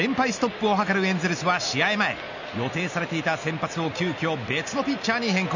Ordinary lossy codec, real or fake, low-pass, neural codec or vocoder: none; real; 7.2 kHz; none